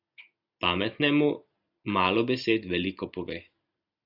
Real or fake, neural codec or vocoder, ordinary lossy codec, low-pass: real; none; AAC, 48 kbps; 5.4 kHz